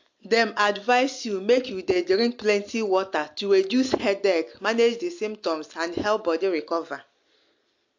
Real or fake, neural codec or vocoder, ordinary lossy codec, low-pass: real; none; AAC, 48 kbps; 7.2 kHz